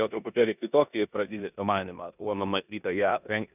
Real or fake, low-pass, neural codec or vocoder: fake; 3.6 kHz; codec, 16 kHz in and 24 kHz out, 0.9 kbps, LongCat-Audio-Codec, four codebook decoder